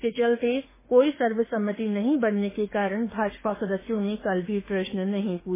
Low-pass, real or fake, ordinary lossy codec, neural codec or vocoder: 3.6 kHz; fake; MP3, 16 kbps; autoencoder, 48 kHz, 32 numbers a frame, DAC-VAE, trained on Japanese speech